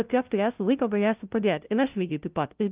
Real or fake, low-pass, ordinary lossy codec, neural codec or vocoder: fake; 3.6 kHz; Opus, 32 kbps; codec, 16 kHz, 0.5 kbps, FunCodec, trained on LibriTTS, 25 frames a second